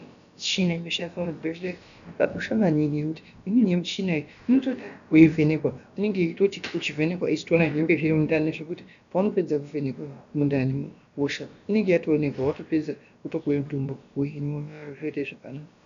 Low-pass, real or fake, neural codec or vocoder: 7.2 kHz; fake; codec, 16 kHz, about 1 kbps, DyCAST, with the encoder's durations